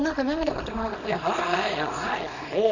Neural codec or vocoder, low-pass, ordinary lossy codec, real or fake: codec, 24 kHz, 0.9 kbps, WavTokenizer, small release; 7.2 kHz; none; fake